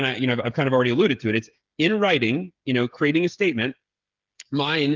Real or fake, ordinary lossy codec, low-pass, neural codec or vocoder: fake; Opus, 24 kbps; 7.2 kHz; codec, 16 kHz, 8 kbps, FreqCodec, smaller model